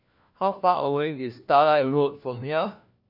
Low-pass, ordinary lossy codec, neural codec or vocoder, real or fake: 5.4 kHz; none; codec, 16 kHz, 1 kbps, FunCodec, trained on LibriTTS, 50 frames a second; fake